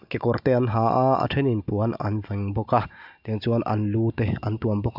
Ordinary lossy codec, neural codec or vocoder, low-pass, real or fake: AAC, 48 kbps; none; 5.4 kHz; real